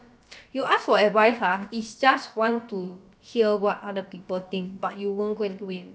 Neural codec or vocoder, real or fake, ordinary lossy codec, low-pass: codec, 16 kHz, about 1 kbps, DyCAST, with the encoder's durations; fake; none; none